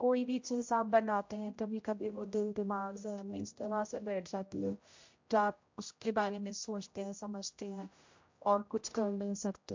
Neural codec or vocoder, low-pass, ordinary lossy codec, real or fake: codec, 16 kHz, 0.5 kbps, X-Codec, HuBERT features, trained on general audio; 7.2 kHz; MP3, 48 kbps; fake